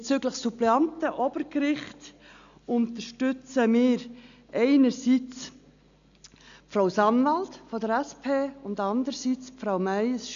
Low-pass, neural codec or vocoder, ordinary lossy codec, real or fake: 7.2 kHz; none; AAC, 96 kbps; real